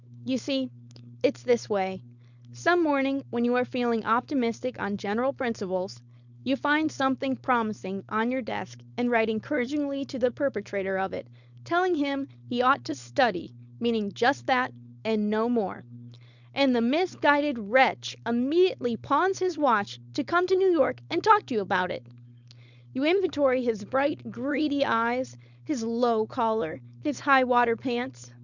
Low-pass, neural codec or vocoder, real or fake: 7.2 kHz; codec, 16 kHz, 4.8 kbps, FACodec; fake